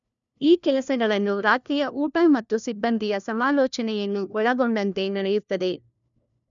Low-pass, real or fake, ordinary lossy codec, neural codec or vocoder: 7.2 kHz; fake; none; codec, 16 kHz, 1 kbps, FunCodec, trained on LibriTTS, 50 frames a second